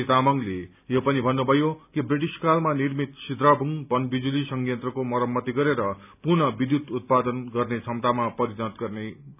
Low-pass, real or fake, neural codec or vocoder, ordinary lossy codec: 3.6 kHz; real; none; none